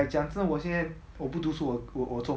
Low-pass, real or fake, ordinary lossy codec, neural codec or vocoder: none; real; none; none